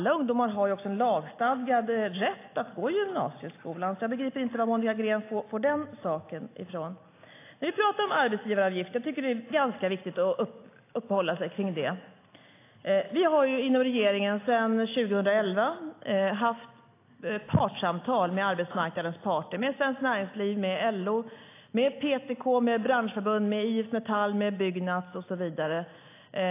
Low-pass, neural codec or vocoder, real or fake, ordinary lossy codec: 3.6 kHz; none; real; AAC, 24 kbps